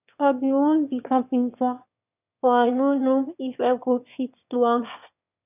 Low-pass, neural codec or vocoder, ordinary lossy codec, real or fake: 3.6 kHz; autoencoder, 22.05 kHz, a latent of 192 numbers a frame, VITS, trained on one speaker; none; fake